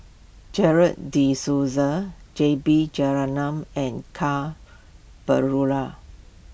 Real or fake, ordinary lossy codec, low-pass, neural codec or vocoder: real; none; none; none